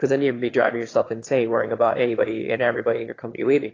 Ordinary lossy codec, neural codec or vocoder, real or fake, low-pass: AAC, 32 kbps; autoencoder, 22.05 kHz, a latent of 192 numbers a frame, VITS, trained on one speaker; fake; 7.2 kHz